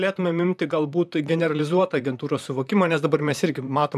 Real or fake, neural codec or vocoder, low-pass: fake; vocoder, 44.1 kHz, 128 mel bands, Pupu-Vocoder; 14.4 kHz